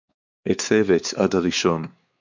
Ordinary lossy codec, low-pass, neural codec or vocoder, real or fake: MP3, 64 kbps; 7.2 kHz; codec, 16 kHz, 4 kbps, X-Codec, WavLM features, trained on Multilingual LibriSpeech; fake